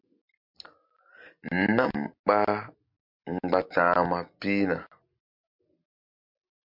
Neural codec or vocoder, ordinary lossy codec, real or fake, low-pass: none; MP3, 48 kbps; real; 5.4 kHz